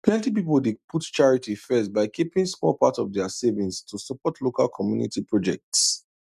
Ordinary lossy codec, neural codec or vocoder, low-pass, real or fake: none; none; 14.4 kHz; real